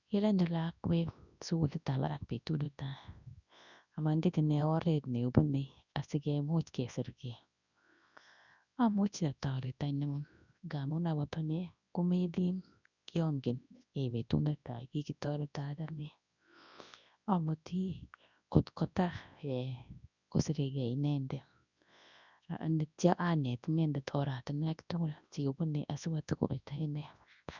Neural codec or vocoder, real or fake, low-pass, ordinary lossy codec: codec, 24 kHz, 0.9 kbps, WavTokenizer, large speech release; fake; 7.2 kHz; none